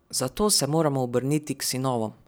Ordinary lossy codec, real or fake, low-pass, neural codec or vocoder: none; fake; none; vocoder, 44.1 kHz, 128 mel bands every 256 samples, BigVGAN v2